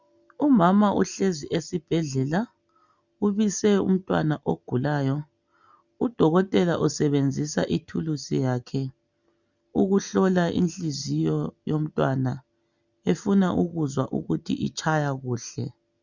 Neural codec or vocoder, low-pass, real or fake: none; 7.2 kHz; real